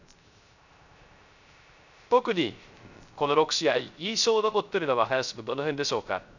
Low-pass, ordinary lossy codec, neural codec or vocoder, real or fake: 7.2 kHz; none; codec, 16 kHz, 0.3 kbps, FocalCodec; fake